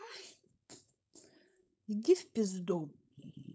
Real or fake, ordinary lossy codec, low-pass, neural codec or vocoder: fake; none; none; codec, 16 kHz, 4.8 kbps, FACodec